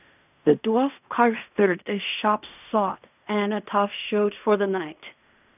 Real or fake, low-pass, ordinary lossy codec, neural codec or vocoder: fake; 3.6 kHz; none; codec, 16 kHz in and 24 kHz out, 0.4 kbps, LongCat-Audio-Codec, fine tuned four codebook decoder